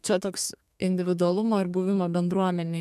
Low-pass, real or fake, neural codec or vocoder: 14.4 kHz; fake; codec, 32 kHz, 1.9 kbps, SNAC